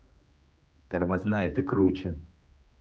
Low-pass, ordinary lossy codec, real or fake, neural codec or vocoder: none; none; fake; codec, 16 kHz, 2 kbps, X-Codec, HuBERT features, trained on general audio